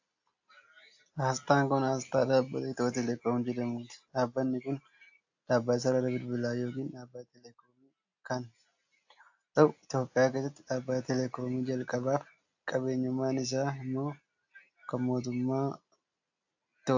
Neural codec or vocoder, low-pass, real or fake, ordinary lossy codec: none; 7.2 kHz; real; AAC, 48 kbps